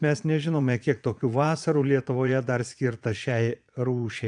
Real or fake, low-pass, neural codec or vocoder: fake; 9.9 kHz; vocoder, 22.05 kHz, 80 mel bands, Vocos